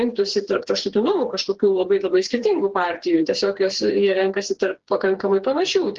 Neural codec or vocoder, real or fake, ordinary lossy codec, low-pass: codec, 16 kHz, 4 kbps, FreqCodec, smaller model; fake; Opus, 16 kbps; 7.2 kHz